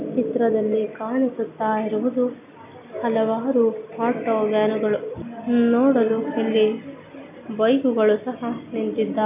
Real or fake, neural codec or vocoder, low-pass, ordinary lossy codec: real; none; 3.6 kHz; none